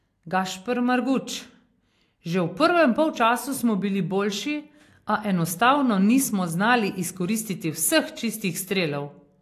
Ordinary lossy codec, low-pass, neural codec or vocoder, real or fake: AAC, 64 kbps; 14.4 kHz; none; real